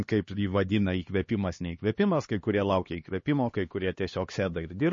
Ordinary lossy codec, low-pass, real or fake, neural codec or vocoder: MP3, 32 kbps; 7.2 kHz; fake; codec, 16 kHz, 2 kbps, X-Codec, HuBERT features, trained on LibriSpeech